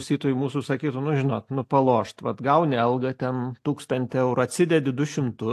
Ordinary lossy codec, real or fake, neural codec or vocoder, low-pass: AAC, 64 kbps; real; none; 14.4 kHz